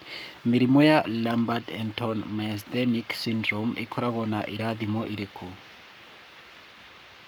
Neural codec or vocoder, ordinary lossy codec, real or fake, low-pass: codec, 44.1 kHz, 7.8 kbps, Pupu-Codec; none; fake; none